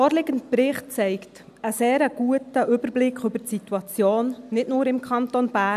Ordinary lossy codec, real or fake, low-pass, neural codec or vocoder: none; real; 14.4 kHz; none